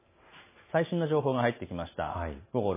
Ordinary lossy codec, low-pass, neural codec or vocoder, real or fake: MP3, 16 kbps; 3.6 kHz; none; real